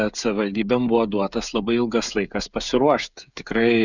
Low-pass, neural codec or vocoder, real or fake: 7.2 kHz; codec, 16 kHz, 16 kbps, FreqCodec, smaller model; fake